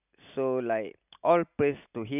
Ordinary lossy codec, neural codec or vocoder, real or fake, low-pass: none; none; real; 3.6 kHz